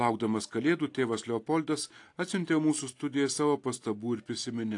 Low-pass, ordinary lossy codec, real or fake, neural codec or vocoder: 10.8 kHz; AAC, 48 kbps; real; none